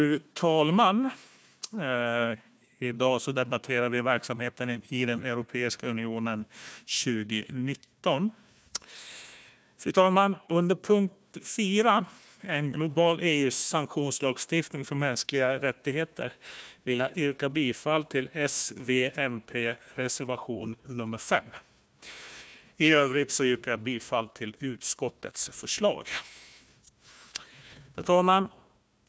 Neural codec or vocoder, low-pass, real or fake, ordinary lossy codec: codec, 16 kHz, 1 kbps, FunCodec, trained on Chinese and English, 50 frames a second; none; fake; none